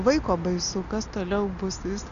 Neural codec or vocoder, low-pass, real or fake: none; 7.2 kHz; real